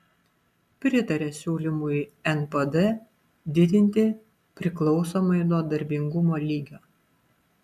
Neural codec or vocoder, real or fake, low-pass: none; real; 14.4 kHz